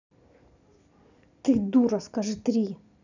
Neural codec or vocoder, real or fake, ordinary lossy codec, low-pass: none; real; none; 7.2 kHz